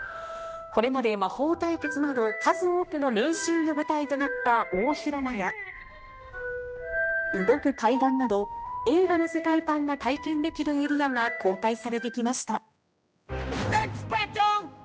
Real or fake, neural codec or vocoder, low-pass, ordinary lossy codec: fake; codec, 16 kHz, 1 kbps, X-Codec, HuBERT features, trained on general audio; none; none